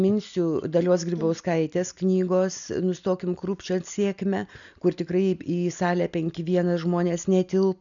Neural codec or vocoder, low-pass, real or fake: none; 7.2 kHz; real